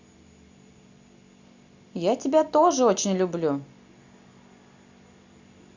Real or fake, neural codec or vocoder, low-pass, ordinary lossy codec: real; none; 7.2 kHz; Opus, 64 kbps